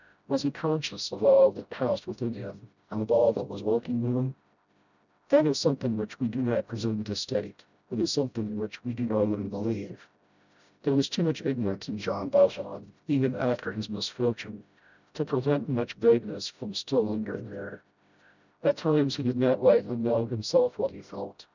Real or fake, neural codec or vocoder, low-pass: fake; codec, 16 kHz, 0.5 kbps, FreqCodec, smaller model; 7.2 kHz